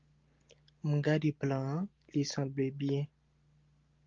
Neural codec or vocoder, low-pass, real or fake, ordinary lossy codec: none; 7.2 kHz; real; Opus, 32 kbps